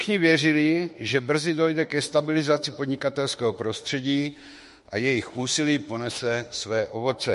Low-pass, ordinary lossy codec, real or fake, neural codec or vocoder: 14.4 kHz; MP3, 48 kbps; fake; autoencoder, 48 kHz, 32 numbers a frame, DAC-VAE, trained on Japanese speech